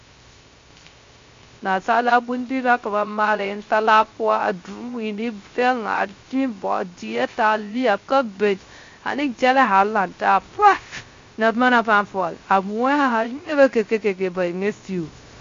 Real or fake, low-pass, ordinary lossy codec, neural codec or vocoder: fake; 7.2 kHz; MP3, 48 kbps; codec, 16 kHz, 0.3 kbps, FocalCodec